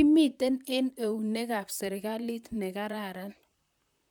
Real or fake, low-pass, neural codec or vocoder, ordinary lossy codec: fake; 19.8 kHz; vocoder, 44.1 kHz, 128 mel bands, Pupu-Vocoder; none